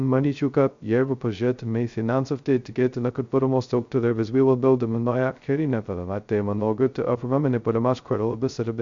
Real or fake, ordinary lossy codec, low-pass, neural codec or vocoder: fake; MP3, 64 kbps; 7.2 kHz; codec, 16 kHz, 0.2 kbps, FocalCodec